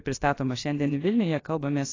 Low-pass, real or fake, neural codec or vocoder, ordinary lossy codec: 7.2 kHz; fake; codec, 16 kHz, about 1 kbps, DyCAST, with the encoder's durations; AAC, 32 kbps